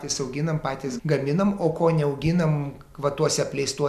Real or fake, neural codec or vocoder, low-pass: real; none; 14.4 kHz